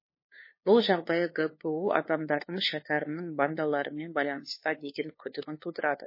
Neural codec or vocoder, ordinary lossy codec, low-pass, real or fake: codec, 16 kHz, 2 kbps, FunCodec, trained on LibriTTS, 25 frames a second; MP3, 24 kbps; 5.4 kHz; fake